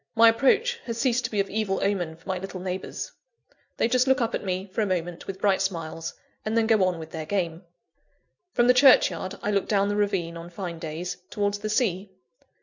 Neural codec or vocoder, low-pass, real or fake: none; 7.2 kHz; real